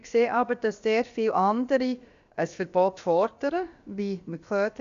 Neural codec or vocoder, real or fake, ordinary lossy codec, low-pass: codec, 16 kHz, about 1 kbps, DyCAST, with the encoder's durations; fake; Opus, 64 kbps; 7.2 kHz